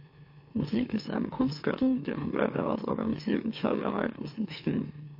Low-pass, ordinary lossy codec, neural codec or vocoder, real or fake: 5.4 kHz; MP3, 32 kbps; autoencoder, 44.1 kHz, a latent of 192 numbers a frame, MeloTTS; fake